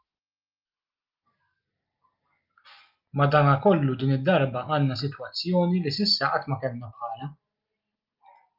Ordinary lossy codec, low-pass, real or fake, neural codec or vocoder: Opus, 24 kbps; 5.4 kHz; real; none